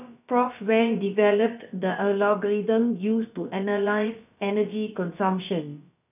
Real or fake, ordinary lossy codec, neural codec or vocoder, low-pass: fake; none; codec, 16 kHz, about 1 kbps, DyCAST, with the encoder's durations; 3.6 kHz